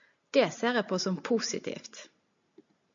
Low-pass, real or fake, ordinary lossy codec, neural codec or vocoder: 7.2 kHz; real; MP3, 64 kbps; none